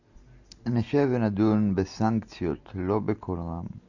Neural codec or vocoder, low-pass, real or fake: none; 7.2 kHz; real